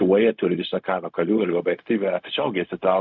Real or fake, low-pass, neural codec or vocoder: fake; 7.2 kHz; codec, 16 kHz, 0.4 kbps, LongCat-Audio-Codec